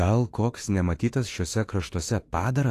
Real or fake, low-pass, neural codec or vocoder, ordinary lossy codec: fake; 14.4 kHz; autoencoder, 48 kHz, 32 numbers a frame, DAC-VAE, trained on Japanese speech; AAC, 48 kbps